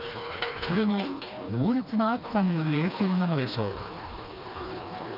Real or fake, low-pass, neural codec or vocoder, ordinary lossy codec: fake; 5.4 kHz; codec, 16 kHz, 2 kbps, FreqCodec, smaller model; none